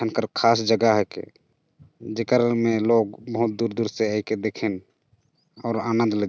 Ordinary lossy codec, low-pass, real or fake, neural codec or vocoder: none; none; real; none